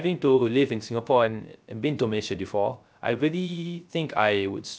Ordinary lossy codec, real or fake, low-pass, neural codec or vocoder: none; fake; none; codec, 16 kHz, 0.3 kbps, FocalCodec